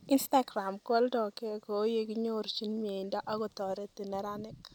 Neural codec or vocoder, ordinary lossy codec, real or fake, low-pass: none; none; real; 19.8 kHz